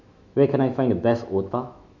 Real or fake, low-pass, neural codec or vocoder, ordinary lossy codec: fake; 7.2 kHz; autoencoder, 48 kHz, 128 numbers a frame, DAC-VAE, trained on Japanese speech; none